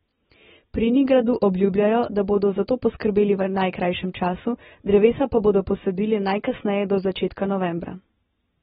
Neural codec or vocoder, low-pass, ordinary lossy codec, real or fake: none; 10.8 kHz; AAC, 16 kbps; real